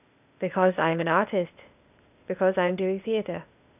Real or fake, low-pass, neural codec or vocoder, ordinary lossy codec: fake; 3.6 kHz; codec, 16 kHz, 0.8 kbps, ZipCodec; none